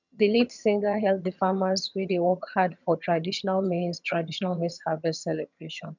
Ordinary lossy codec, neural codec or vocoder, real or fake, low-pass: none; vocoder, 22.05 kHz, 80 mel bands, HiFi-GAN; fake; 7.2 kHz